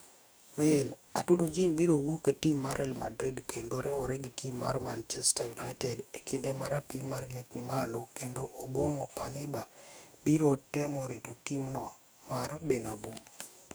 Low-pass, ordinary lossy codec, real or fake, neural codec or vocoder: none; none; fake; codec, 44.1 kHz, 2.6 kbps, DAC